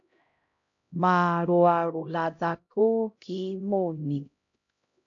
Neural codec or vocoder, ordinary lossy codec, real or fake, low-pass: codec, 16 kHz, 0.5 kbps, X-Codec, HuBERT features, trained on LibriSpeech; AAC, 48 kbps; fake; 7.2 kHz